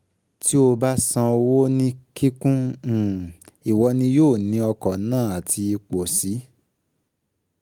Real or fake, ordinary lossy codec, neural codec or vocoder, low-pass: real; Opus, 32 kbps; none; 19.8 kHz